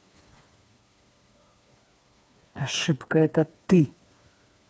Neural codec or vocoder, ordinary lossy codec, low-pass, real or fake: codec, 16 kHz, 4 kbps, FreqCodec, smaller model; none; none; fake